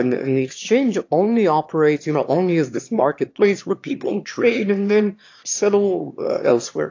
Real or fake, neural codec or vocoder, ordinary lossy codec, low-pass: fake; autoencoder, 22.05 kHz, a latent of 192 numbers a frame, VITS, trained on one speaker; AAC, 48 kbps; 7.2 kHz